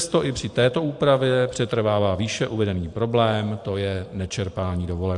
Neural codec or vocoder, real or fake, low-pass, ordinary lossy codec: vocoder, 44.1 kHz, 128 mel bands every 512 samples, BigVGAN v2; fake; 10.8 kHz; AAC, 64 kbps